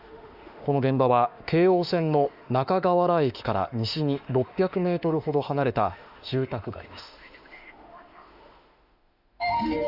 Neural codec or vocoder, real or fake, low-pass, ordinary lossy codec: autoencoder, 48 kHz, 32 numbers a frame, DAC-VAE, trained on Japanese speech; fake; 5.4 kHz; Opus, 64 kbps